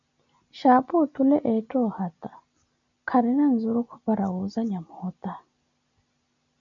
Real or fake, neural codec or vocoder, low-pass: real; none; 7.2 kHz